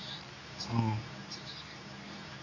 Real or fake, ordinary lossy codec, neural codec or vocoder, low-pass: fake; none; codec, 24 kHz, 0.9 kbps, WavTokenizer, medium speech release version 2; 7.2 kHz